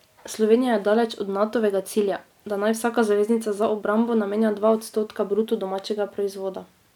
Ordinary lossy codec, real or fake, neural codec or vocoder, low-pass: none; real; none; 19.8 kHz